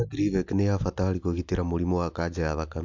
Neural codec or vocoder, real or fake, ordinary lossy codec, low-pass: none; real; MP3, 64 kbps; 7.2 kHz